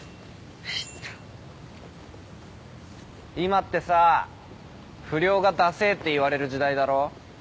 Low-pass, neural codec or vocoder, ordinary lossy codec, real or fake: none; none; none; real